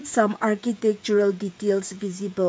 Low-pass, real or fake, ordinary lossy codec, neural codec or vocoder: none; fake; none; codec, 16 kHz, 16 kbps, FreqCodec, smaller model